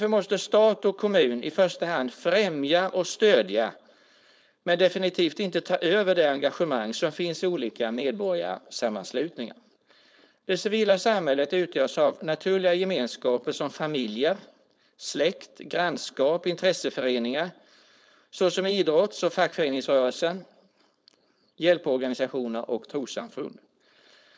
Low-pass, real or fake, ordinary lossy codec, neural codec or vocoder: none; fake; none; codec, 16 kHz, 4.8 kbps, FACodec